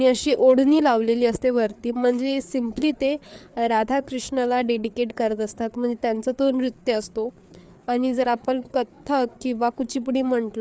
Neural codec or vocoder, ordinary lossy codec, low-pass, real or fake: codec, 16 kHz, 4 kbps, FreqCodec, larger model; none; none; fake